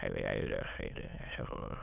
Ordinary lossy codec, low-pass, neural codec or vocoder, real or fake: none; 3.6 kHz; autoencoder, 22.05 kHz, a latent of 192 numbers a frame, VITS, trained on many speakers; fake